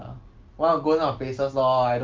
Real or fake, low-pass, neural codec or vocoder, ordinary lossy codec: real; 7.2 kHz; none; Opus, 24 kbps